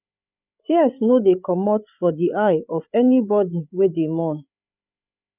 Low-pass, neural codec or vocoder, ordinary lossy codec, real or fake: 3.6 kHz; codec, 16 kHz, 16 kbps, FreqCodec, larger model; none; fake